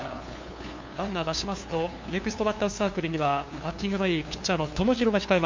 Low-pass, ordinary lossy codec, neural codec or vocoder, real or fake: 7.2 kHz; MP3, 48 kbps; codec, 16 kHz, 2 kbps, FunCodec, trained on LibriTTS, 25 frames a second; fake